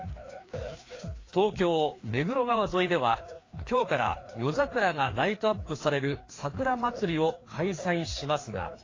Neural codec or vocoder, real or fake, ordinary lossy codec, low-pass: codec, 16 kHz, 2 kbps, FreqCodec, larger model; fake; AAC, 32 kbps; 7.2 kHz